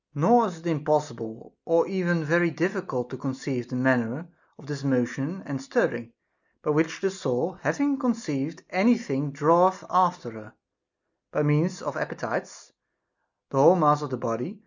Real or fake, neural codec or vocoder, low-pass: real; none; 7.2 kHz